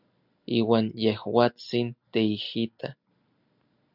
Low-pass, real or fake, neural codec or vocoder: 5.4 kHz; real; none